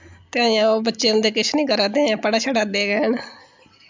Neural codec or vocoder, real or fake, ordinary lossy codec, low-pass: none; real; MP3, 64 kbps; 7.2 kHz